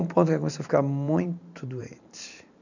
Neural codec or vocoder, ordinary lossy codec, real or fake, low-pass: vocoder, 44.1 kHz, 128 mel bands every 512 samples, BigVGAN v2; none; fake; 7.2 kHz